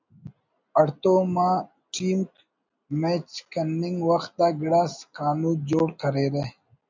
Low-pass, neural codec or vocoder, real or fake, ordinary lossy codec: 7.2 kHz; none; real; MP3, 64 kbps